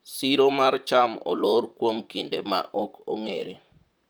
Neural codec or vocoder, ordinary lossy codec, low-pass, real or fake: vocoder, 44.1 kHz, 128 mel bands, Pupu-Vocoder; none; none; fake